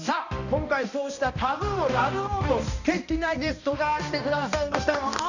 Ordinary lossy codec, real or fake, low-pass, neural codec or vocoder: none; fake; 7.2 kHz; codec, 16 kHz, 1 kbps, X-Codec, HuBERT features, trained on balanced general audio